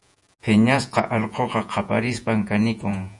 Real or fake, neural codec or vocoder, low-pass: fake; vocoder, 48 kHz, 128 mel bands, Vocos; 10.8 kHz